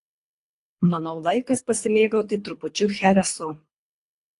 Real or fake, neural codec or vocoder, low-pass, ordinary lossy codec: fake; codec, 24 kHz, 3 kbps, HILCodec; 10.8 kHz; AAC, 48 kbps